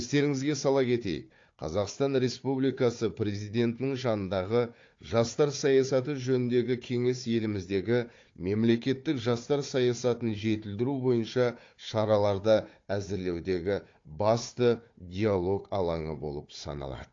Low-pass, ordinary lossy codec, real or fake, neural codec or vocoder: 7.2 kHz; AAC, 48 kbps; fake; codec, 16 kHz, 4 kbps, FunCodec, trained on Chinese and English, 50 frames a second